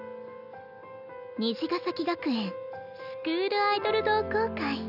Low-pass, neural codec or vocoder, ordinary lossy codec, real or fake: 5.4 kHz; none; none; real